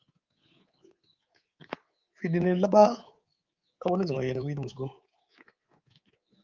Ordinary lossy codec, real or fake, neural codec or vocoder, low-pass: Opus, 24 kbps; fake; codec, 24 kHz, 3.1 kbps, DualCodec; 7.2 kHz